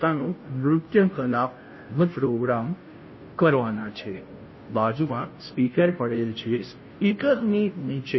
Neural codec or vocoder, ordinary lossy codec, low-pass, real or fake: codec, 16 kHz, 0.5 kbps, FunCodec, trained on Chinese and English, 25 frames a second; MP3, 24 kbps; 7.2 kHz; fake